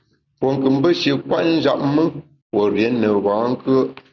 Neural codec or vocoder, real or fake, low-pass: none; real; 7.2 kHz